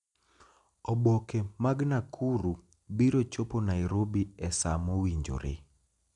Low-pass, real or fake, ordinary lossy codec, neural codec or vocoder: 10.8 kHz; real; none; none